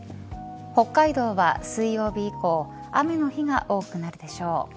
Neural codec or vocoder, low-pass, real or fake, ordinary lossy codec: none; none; real; none